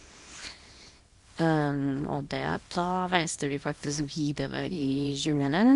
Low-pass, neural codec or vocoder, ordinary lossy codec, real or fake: 10.8 kHz; codec, 24 kHz, 0.9 kbps, WavTokenizer, small release; MP3, 64 kbps; fake